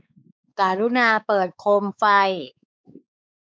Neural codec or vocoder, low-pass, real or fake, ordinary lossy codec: codec, 16 kHz, 4 kbps, X-Codec, WavLM features, trained on Multilingual LibriSpeech; none; fake; none